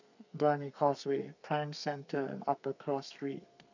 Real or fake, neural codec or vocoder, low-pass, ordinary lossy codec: fake; codec, 32 kHz, 1.9 kbps, SNAC; 7.2 kHz; none